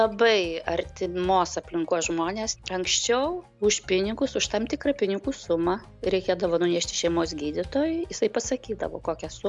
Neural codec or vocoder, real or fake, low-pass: none; real; 10.8 kHz